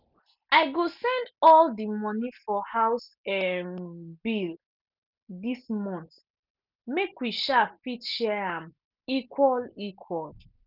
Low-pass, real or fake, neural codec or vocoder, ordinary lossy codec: 5.4 kHz; real; none; none